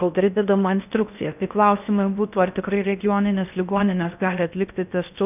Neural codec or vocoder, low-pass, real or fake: codec, 16 kHz in and 24 kHz out, 0.6 kbps, FocalCodec, streaming, 4096 codes; 3.6 kHz; fake